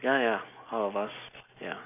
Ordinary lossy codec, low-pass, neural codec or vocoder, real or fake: none; 3.6 kHz; none; real